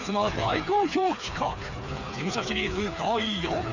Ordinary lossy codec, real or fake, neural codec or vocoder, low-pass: none; fake; codec, 16 kHz, 4 kbps, FreqCodec, smaller model; 7.2 kHz